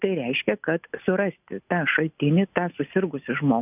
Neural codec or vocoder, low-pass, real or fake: none; 3.6 kHz; real